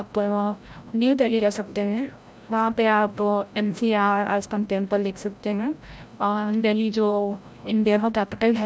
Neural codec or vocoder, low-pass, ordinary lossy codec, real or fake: codec, 16 kHz, 0.5 kbps, FreqCodec, larger model; none; none; fake